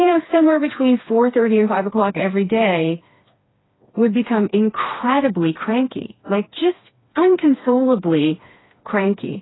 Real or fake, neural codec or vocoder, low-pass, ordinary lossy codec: fake; codec, 16 kHz, 2 kbps, FreqCodec, smaller model; 7.2 kHz; AAC, 16 kbps